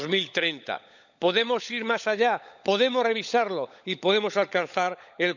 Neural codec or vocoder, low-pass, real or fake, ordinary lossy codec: codec, 16 kHz, 16 kbps, FunCodec, trained on LibriTTS, 50 frames a second; 7.2 kHz; fake; none